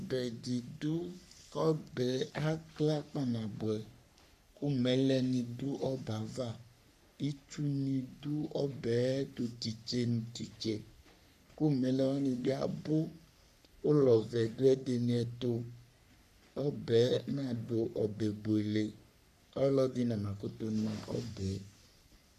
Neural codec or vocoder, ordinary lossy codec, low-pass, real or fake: codec, 44.1 kHz, 3.4 kbps, Pupu-Codec; AAC, 96 kbps; 14.4 kHz; fake